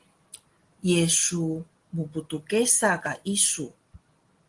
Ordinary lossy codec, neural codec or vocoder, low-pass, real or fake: Opus, 24 kbps; none; 10.8 kHz; real